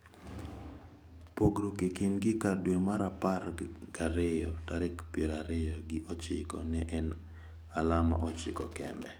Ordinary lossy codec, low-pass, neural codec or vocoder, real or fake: none; none; codec, 44.1 kHz, 7.8 kbps, DAC; fake